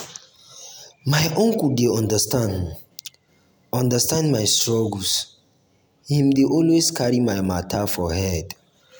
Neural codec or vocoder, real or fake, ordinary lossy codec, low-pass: none; real; none; none